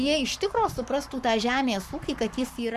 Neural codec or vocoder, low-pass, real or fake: codec, 44.1 kHz, 7.8 kbps, Pupu-Codec; 14.4 kHz; fake